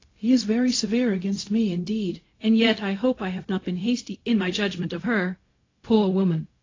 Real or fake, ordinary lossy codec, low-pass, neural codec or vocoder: fake; AAC, 32 kbps; 7.2 kHz; codec, 16 kHz, 0.4 kbps, LongCat-Audio-Codec